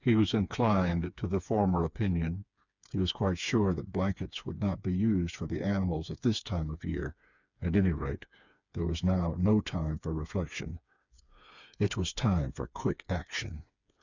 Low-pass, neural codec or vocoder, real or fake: 7.2 kHz; codec, 16 kHz, 4 kbps, FreqCodec, smaller model; fake